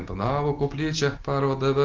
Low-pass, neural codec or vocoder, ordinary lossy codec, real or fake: 7.2 kHz; none; Opus, 16 kbps; real